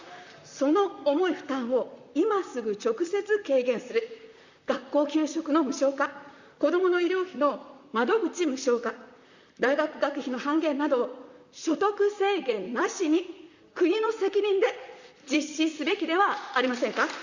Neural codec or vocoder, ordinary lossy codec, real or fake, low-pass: vocoder, 44.1 kHz, 128 mel bands, Pupu-Vocoder; Opus, 64 kbps; fake; 7.2 kHz